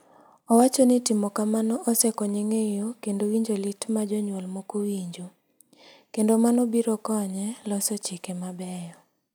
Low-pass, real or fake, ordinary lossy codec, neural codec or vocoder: none; real; none; none